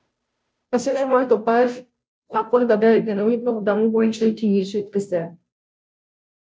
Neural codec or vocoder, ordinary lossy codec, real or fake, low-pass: codec, 16 kHz, 0.5 kbps, FunCodec, trained on Chinese and English, 25 frames a second; none; fake; none